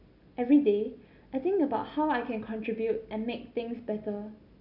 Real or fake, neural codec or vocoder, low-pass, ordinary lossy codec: real; none; 5.4 kHz; none